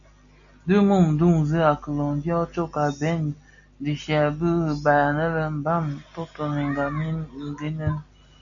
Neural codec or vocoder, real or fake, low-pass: none; real; 7.2 kHz